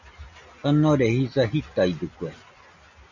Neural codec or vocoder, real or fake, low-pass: none; real; 7.2 kHz